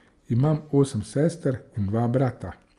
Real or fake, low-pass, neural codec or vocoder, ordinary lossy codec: real; 10.8 kHz; none; Opus, 32 kbps